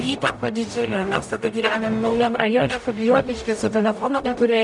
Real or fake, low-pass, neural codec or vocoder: fake; 10.8 kHz; codec, 44.1 kHz, 0.9 kbps, DAC